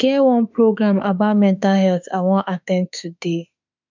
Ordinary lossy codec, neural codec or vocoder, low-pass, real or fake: none; autoencoder, 48 kHz, 32 numbers a frame, DAC-VAE, trained on Japanese speech; 7.2 kHz; fake